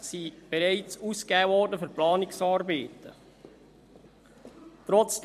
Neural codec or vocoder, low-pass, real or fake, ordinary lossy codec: vocoder, 44.1 kHz, 128 mel bands every 256 samples, BigVGAN v2; 14.4 kHz; fake; MP3, 64 kbps